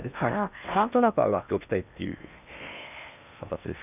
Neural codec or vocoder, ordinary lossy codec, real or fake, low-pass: codec, 16 kHz in and 24 kHz out, 0.8 kbps, FocalCodec, streaming, 65536 codes; none; fake; 3.6 kHz